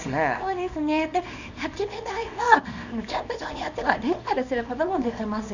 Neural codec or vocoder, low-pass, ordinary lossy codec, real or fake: codec, 24 kHz, 0.9 kbps, WavTokenizer, small release; 7.2 kHz; none; fake